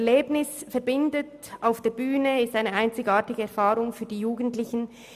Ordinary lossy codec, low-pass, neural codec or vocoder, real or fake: MP3, 96 kbps; 14.4 kHz; none; real